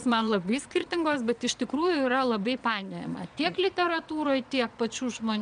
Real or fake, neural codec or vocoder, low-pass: fake; vocoder, 22.05 kHz, 80 mel bands, Vocos; 9.9 kHz